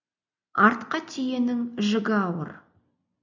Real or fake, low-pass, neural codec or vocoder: real; 7.2 kHz; none